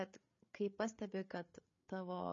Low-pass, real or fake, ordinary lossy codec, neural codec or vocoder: 7.2 kHz; fake; MP3, 32 kbps; codec, 16 kHz, 8 kbps, FreqCodec, larger model